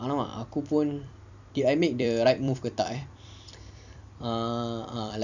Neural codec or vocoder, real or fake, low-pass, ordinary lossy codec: vocoder, 44.1 kHz, 128 mel bands every 256 samples, BigVGAN v2; fake; 7.2 kHz; none